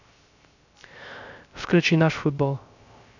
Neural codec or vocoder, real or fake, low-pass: codec, 16 kHz, 0.3 kbps, FocalCodec; fake; 7.2 kHz